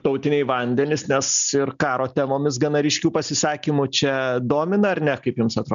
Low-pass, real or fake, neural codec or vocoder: 7.2 kHz; real; none